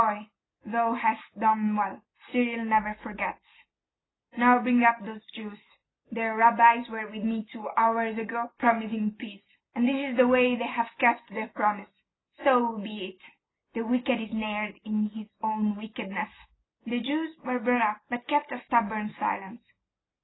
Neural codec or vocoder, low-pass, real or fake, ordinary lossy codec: none; 7.2 kHz; real; AAC, 16 kbps